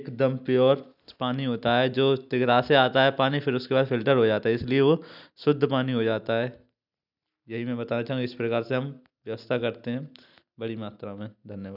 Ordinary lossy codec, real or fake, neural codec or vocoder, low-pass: none; real; none; 5.4 kHz